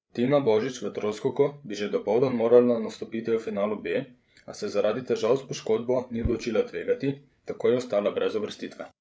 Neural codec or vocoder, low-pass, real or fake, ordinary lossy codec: codec, 16 kHz, 8 kbps, FreqCodec, larger model; none; fake; none